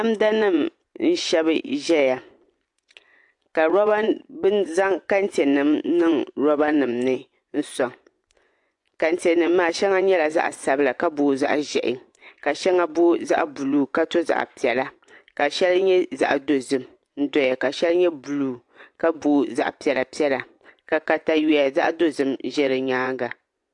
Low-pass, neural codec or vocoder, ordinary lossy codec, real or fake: 10.8 kHz; vocoder, 24 kHz, 100 mel bands, Vocos; AAC, 64 kbps; fake